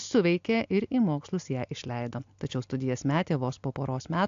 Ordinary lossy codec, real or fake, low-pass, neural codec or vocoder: AAC, 64 kbps; real; 7.2 kHz; none